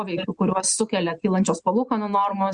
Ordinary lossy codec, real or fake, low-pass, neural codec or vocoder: Opus, 64 kbps; real; 9.9 kHz; none